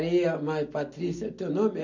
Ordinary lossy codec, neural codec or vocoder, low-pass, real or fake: none; none; 7.2 kHz; real